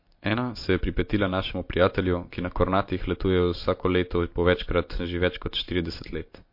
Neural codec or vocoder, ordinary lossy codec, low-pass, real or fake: none; MP3, 32 kbps; 5.4 kHz; real